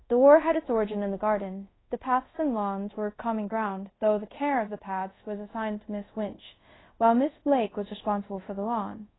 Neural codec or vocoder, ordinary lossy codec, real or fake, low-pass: codec, 24 kHz, 0.5 kbps, DualCodec; AAC, 16 kbps; fake; 7.2 kHz